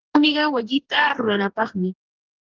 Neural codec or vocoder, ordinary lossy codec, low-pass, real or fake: codec, 44.1 kHz, 2.6 kbps, DAC; Opus, 16 kbps; 7.2 kHz; fake